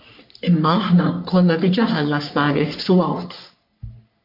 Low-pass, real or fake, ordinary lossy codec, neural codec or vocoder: 5.4 kHz; fake; MP3, 48 kbps; codec, 44.1 kHz, 3.4 kbps, Pupu-Codec